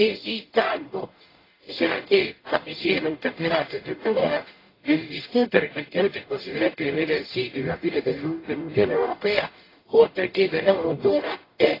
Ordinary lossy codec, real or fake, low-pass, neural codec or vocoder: AAC, 24 kbps; fake; 5.4 kHz; codec, 44.1 kHz, 0.9 kbps, DAC